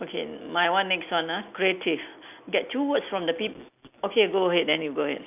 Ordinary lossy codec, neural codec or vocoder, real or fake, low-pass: none; none; real; 3.6 kHz